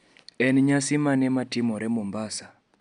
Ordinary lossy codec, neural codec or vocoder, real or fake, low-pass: none; none; real; 9.9 kHz